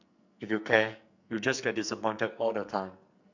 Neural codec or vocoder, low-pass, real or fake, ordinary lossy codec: codec, 44.1 kHz, 2.6 kbps, SNAC; 7.2 kHz; fake; none